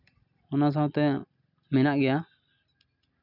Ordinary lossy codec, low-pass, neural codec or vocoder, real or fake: none; 5.4 kHz; none; real